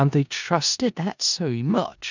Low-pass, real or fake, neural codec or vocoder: 7.2 kHz; fake; codec, 16 kHz in and 24 kHz out, 0.4 kbps, LongCat-Audio-Codec, four codebook decoder